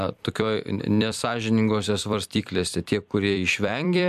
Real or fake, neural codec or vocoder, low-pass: fake; vocoder, 44.1 kHz, 128 mel bands every 256 samples, BigVGAN v2; 14.4 kHz